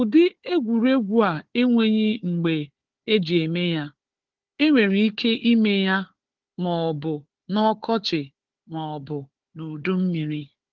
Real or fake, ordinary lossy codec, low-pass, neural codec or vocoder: fake; Opus, 16 kbps; 7.2 kHz; codec, 16 kHz, 4 kbps, FunCodec, trained on Chinese and English, 50 frames a second